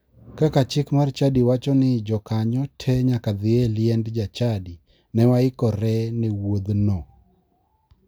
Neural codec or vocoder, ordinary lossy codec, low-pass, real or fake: none; none; none; real